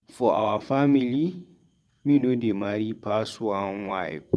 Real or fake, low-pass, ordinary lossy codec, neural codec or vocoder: fake; none; none; vocoder, 22.05 kHz, 80 mel bands, WaveNeXt